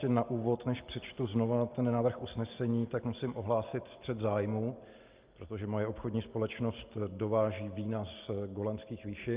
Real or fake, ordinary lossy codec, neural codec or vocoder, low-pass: real; Opus, 24 kbps; none; 3.6 kHz